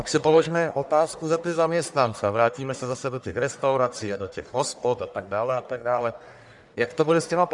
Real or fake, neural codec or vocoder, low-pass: fake; codec, 44.1 kHz, 1.7 kbps, Pupu-Codec; 10.8 kHz